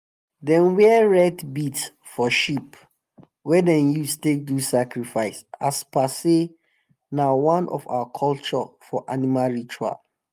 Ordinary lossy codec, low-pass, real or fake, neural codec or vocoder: Opus, 32 kbps; 14.4 kHz; real; none